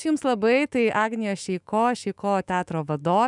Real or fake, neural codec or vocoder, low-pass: fake; autoencoder, 48 kHz, 128 numbers a frame, DAC-VAE, trained on Japanese speech; 10.8 kHz